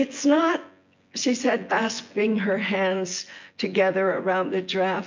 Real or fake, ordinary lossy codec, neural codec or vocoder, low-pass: fake; MP3, 48 kbps; vocoder, 24 kHz, 100 mel bands, Vocos; 7.2 kHz